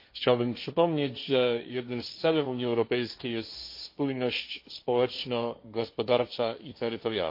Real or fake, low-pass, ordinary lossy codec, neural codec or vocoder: fake; 5.4 kHz; MP3, 32 kbps; codec, 16 kHz, 1.1 kbps, Voila-Tokenizer